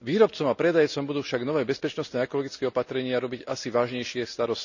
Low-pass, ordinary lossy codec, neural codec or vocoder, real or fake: 7.2 kHz; none; none; real